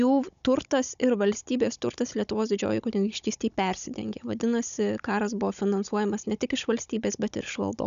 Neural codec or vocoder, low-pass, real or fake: codec, 16 kHz, 16 kbps, FunCodec, trained on Chinese and English, 50 frames a second; 7.2 kHz; fake